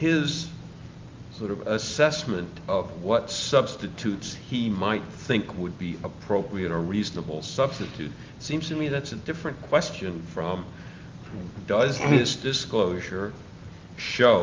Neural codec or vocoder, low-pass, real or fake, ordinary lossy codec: none; 7.2 kHz; real; Opus, 32 kbps